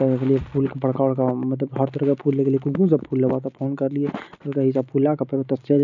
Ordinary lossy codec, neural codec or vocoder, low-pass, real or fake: none; none; 7.2 kHz; real